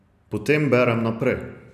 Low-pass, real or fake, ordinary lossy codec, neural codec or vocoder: 14.4 kHz; real; none; none